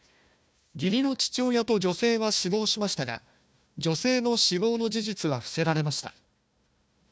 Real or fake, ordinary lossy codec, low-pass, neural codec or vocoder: fake; none; none; codec, 16 kHz, 1 kbps, FunCodec, trained on Chinese and English, 50 frames a second